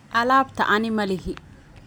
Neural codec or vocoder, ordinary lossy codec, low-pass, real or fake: none; none; none; real